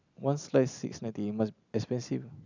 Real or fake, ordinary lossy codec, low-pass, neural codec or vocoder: real; none; 7.2 kHz; none